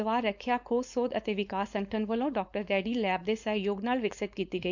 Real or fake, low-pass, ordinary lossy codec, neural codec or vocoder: fake; 7.2 kHz; none; codec, 16 kHz, 4.8 kbps, FACodec